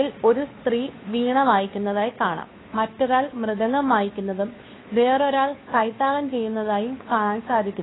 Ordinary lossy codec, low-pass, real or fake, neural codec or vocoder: AAC, 16 kbps; 7.2 kHz; fake; codec, 16 kHz, 2 kbps, FunCodec, trained on Chinese and English, 25 frames a second